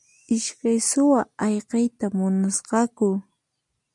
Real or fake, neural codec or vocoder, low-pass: real; none; 10.8 kHz